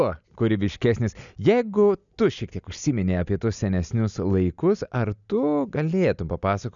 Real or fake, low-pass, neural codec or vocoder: real; 7.2 kHz; none